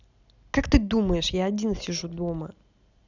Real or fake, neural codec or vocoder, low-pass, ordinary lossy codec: real; none; 7.2 kHz; none